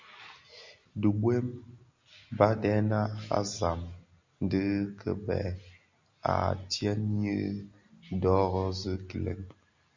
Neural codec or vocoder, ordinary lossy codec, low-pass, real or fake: none; MP3, 64 kbps; 7.2 kHz; real